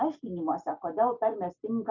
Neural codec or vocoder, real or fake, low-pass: none; real; 7.2 kHz